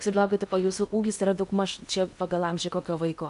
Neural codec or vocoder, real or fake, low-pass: codec, 16 kHz in and 24 kHz out, 0.8 kbps, FocalCodec, streaming, 65536 codes; fake; 10.8 kHz